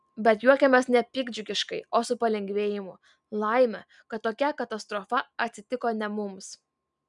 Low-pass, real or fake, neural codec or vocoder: 10.8 kHz; real; none